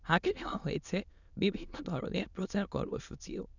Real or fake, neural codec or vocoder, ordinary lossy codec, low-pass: fake; autoencoder, 22.05 kHz, a latent of 192 numbers a frame, VITS, trained on many speakers; none; 7.2 kHz